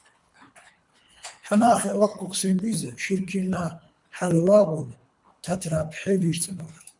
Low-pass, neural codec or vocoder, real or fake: 10.8 kHz; codec, 24 kHz, 3 kbps, HILCodec; fake